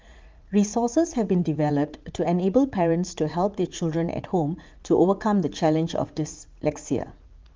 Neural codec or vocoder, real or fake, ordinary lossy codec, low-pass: vocoder, 44.1 kHz, 80 mel bands, Vocos; fake; Opus, 24 kbps; 7.2 kHz